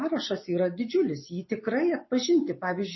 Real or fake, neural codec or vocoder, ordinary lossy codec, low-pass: real; none; MP3, 24 kbps; 7.2 kHz